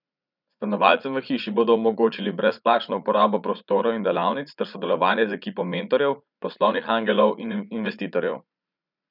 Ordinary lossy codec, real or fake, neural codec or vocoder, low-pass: none; fake; vocoder, 44.1 kHz, 80 mel bands, Vocos; 5.4 kHz